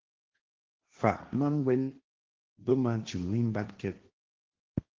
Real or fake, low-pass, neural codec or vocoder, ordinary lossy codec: fake; 7.2 kHz; codec, 16 kHz, 1.1 kbps, Voila-Tokenizer; Opus, 16 kbps